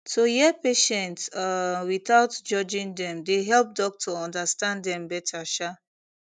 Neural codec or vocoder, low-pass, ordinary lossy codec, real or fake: none; none; none; real